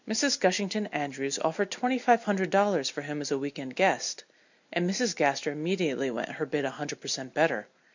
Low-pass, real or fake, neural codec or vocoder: 7.2 kHz; real; none